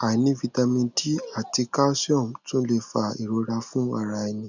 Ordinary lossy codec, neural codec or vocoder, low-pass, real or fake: none; none; 7.2 kHz; real